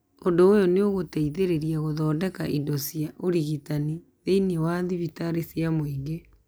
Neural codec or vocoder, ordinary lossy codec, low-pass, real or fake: none; none; none; real